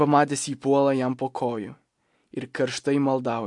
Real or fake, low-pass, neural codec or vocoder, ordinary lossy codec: real; 10.8 kHz; none; MP3, 64 kbps